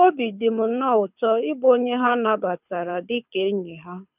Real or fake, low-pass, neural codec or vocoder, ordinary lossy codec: fake; 3.6 kHz; codec, 24 kHz, 6 kbps, HILCodec; none